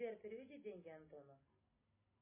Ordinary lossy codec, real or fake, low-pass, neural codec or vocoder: MP3, 16 kbps; real; 3.6 kHz; none